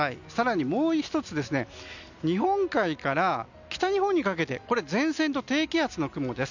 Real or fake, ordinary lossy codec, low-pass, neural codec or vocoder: real; none; 7.2 kHz; none